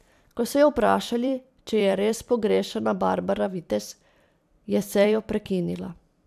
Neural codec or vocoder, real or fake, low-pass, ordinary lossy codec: vocoder, 44.1 kHz, 128 mel bands every 256 samples, BigVGAN v2; fake; 14.4 kHz; none